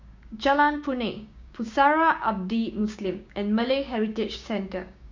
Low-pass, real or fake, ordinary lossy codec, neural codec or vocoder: 7.2 kHz; fake; MP3, 64 kbps; codec, 16 kHz, 6 kbps, DAC